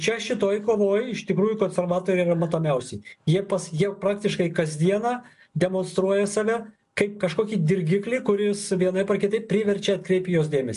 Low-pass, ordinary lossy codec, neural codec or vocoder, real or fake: 10.8 kHz; MP3, 64 kbps; none; real